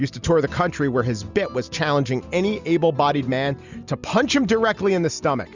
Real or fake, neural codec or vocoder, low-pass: real; none; 7.2 kHz